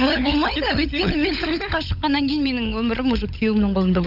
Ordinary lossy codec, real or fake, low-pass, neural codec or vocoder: none; fake; 5.4 kHz; codec, 16 kHz, 16 kbps, FunCodec, trained on LibriTTS, 50 frames a second